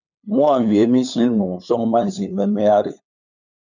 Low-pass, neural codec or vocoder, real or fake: 7.2 kHz; codec, 16 kHz, 8 kbps, FunCodec, trained on LibriTTS, 25 frames a second; fake